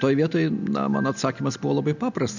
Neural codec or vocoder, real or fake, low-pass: none; real; 7.2 kHz